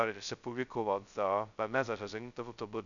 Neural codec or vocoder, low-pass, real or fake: codec, 16 kHz, 0.2 kbps, FocalCodec; 7.2 kHz; fake